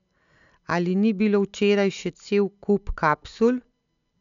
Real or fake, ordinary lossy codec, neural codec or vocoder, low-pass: real; MP3, 96 kbps; none; 7.2 kHz